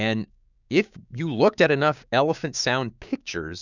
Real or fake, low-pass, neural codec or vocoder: fake; 7.2 kHz; codec, 16 kHz, 6 kbps, DAC